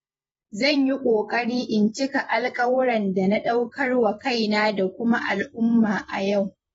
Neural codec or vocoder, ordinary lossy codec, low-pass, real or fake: vocoder, 48 kHz, 128 mel bands, Vocos; AAC, 24 kbps; 19.8 kHz; fake